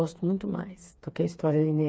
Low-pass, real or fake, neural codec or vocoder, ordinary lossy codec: none; fake; codec, 16 kHz, 4 kbps, FreqCodec, smaller model; none